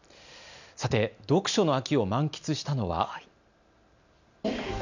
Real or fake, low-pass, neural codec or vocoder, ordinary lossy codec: real; 7.2 kHz; none; none